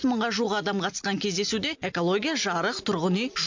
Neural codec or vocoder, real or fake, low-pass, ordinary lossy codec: none; real; 7.2 kHz; MP3, 64 kbps